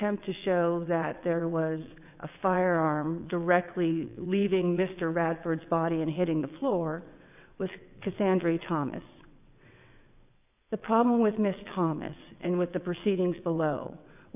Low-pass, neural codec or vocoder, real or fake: 3.6 kHz; vocoder, 22.05 kHz, 80 mel bands, WaveNeXt; fake